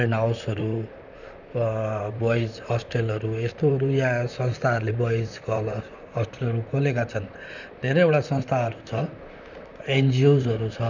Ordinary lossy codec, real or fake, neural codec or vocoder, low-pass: none; fake; vocoder, 44.1 kHz, 128 mel bands, Pupu-Vocoder; 7.2 kHz